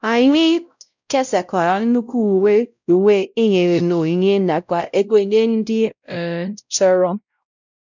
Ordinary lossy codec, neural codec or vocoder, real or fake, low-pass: none; codec, 16 kHz, 0.5 kbps, X-Codec, WavLM features, trained on Multilingual LibriSpeech; fake; 7.2 kHz